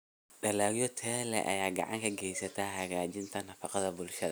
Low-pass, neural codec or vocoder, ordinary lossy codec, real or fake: none; none; none; real